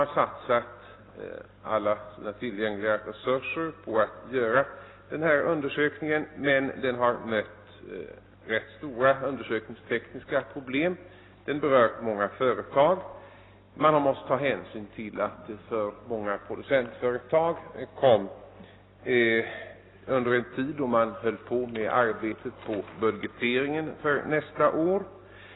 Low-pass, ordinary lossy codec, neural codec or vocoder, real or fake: 7.2 kHz; AAC, 16 kbps; none; real